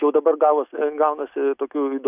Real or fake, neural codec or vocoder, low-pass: real; none; 3.6 kHz